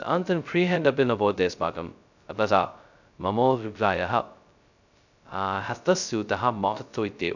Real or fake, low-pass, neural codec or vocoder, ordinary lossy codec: fake; 7.2 kHz; codec, 16 kHz, 0.2 kbps, FocalCodec; none